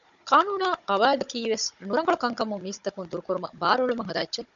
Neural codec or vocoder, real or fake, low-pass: codec, 16 kHz, 16 kbps, FunCodec, trained on Chinese and English, 50 frames a second; fake; 7.2 kHz